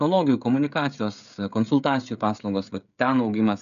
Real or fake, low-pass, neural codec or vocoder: fake; 7.2 kHz; codec, 16 kHz, 16 kbps, FreqCodec, smaller model